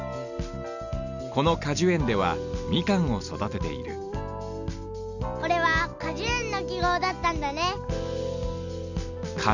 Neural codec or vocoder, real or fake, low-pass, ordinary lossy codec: none; real; 7.2 kHz; none